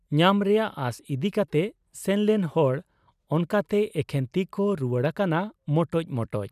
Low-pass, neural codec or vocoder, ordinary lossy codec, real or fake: 14.4 kHz; vocoder, 44.1 kHz, 128 mel bands every 256 samples, BigVGAN v2; none; fake